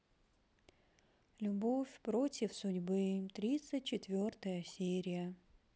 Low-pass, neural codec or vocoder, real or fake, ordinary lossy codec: none; none; real; none